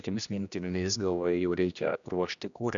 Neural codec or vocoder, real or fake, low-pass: codec, 16 kHz, 1 kbps, X-Codec, HuBERT features, trained on general audio; fake; 7.2 kHz